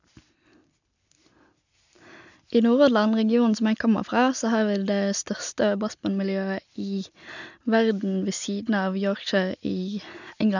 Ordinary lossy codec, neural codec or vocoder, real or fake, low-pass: none; none; real; 7.2 kHz